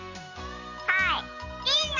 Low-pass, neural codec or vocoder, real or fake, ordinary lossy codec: 7.2 kHz; none; real; none